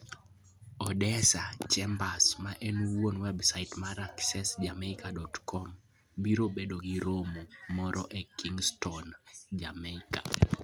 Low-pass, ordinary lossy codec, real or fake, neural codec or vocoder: none; none; real; none